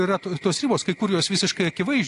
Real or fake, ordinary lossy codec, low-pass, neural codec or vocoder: real; AAC, 48 kbps; 10.8 kHz; none